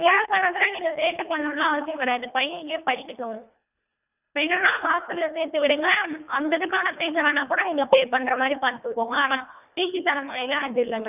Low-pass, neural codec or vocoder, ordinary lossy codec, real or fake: 3.6 kHz; codec, 24 kHz, 1.5 kbps, HILCodec; none; fake